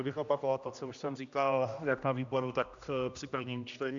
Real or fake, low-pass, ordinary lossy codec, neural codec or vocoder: fake; 7.2 kHz; Opus, 64 kbps; codec, 16 kHz, 1 kbps, X-Codec, HuBERT features, trained on general audio